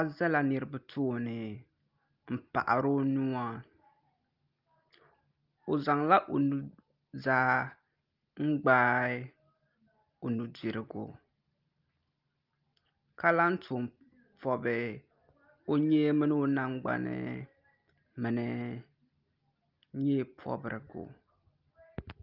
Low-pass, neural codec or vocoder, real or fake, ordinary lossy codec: 5.4 kHz; none; real; Opus, 32 kbps